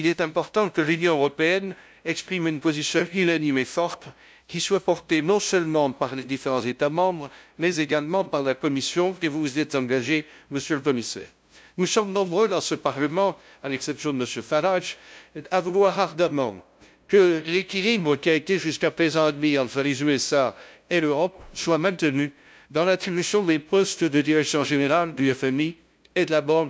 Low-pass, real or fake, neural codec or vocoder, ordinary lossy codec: none; fake; codec, 16 kHz, 0.5 kbps, FunCodec, trained on LibriTTS, 25 frames a second; none